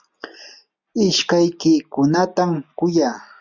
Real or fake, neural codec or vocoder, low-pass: real; none; 7.2 kHz